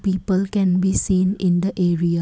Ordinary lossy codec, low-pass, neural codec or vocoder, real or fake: none; none; none; real